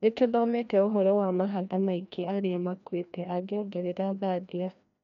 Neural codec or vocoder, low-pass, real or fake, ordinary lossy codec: codec, 16 kHz, 1 kbps, FreqCodec, larger model; 7.2 kHz; fake; none